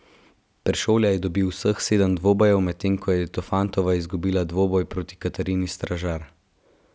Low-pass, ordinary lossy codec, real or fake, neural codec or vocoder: none; none; real; none